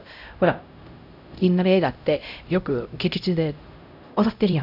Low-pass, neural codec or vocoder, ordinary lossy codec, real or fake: 5.4 kHz; codec, 16 kHz, 0.5 kbps, X-Codec, HuBERT features, trained on LibriSpeech; none; fake